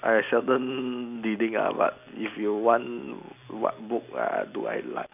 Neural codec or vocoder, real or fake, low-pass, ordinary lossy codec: none; real; 3.6 kHz; none